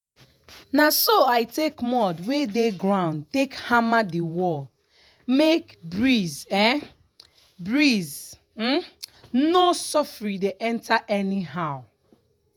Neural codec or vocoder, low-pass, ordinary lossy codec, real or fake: vocoder, 48 kHz, 128 mel bands, Vocos; none; none; fake